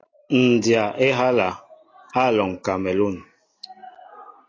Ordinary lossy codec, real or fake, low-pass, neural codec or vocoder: AAC, 32 kbps; real; 7.2 kHz; none